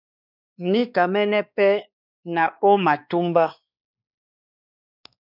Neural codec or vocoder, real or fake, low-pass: codec, 16 kHz, 2 kbps, X-Codec, WavLM features, trained on Multilingual LibriSpeech; fake; 5.4 kHz